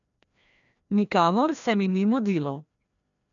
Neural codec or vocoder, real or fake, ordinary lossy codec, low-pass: codec, 16 kHz, 1 kbps, FreqCodec, larger model; fake; none; 7.2 kHz